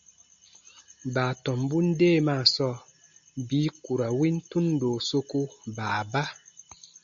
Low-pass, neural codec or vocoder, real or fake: 7.2 kHz; none; real